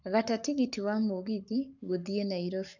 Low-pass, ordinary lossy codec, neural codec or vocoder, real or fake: 7.2 kHz; none; codec, 44.1 kHz, 7.8 kbps, Pupu-Codec; fake